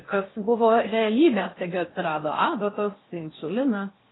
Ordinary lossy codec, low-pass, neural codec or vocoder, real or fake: AAC, 16 kbps; 7.2 kHz; codec, 16 kHz in and 24 kHz out, 0.6 kbps, FocalCodec, streaming, 4096 codes; fake